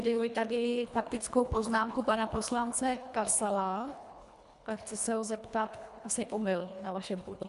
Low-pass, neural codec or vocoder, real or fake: 10.8 kHz; codec, 24 kHz, 1.5 kbps, HILCodec; fake